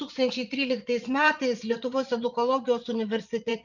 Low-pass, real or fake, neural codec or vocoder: 7.2 kHz; fake; vocoder, 22.05 kHz, 80 mel bands, Vocos